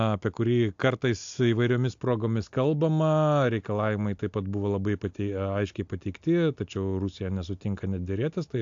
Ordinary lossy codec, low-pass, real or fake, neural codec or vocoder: AAC, 64 kbps; 7.2 kHz; real; none